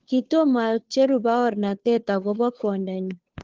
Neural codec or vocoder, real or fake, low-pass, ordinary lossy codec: codec, 16 kHz, 2 kbps, FunCodec, trained on Chinese and English, 25 frames a second; fake; 7.2 kHz; Opus, 16 kbps